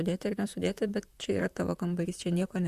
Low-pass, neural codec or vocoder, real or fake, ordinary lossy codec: 14.4 kHz; vocoder, 44.1 kHz, 128 mel bands, Pupu-Vocoder; fake; Opus, 64 kbps